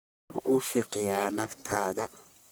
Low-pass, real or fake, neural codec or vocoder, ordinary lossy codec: none; fake; codec, 44.1 kHz, 1.7 kbps, Pupu-Codec; none